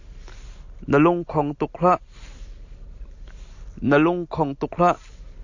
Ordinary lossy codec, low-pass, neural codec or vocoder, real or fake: AAC, 48 kbps; 7.2 kHz; none; real